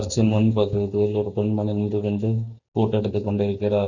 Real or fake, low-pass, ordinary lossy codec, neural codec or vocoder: real; 7.2 kHz; none; none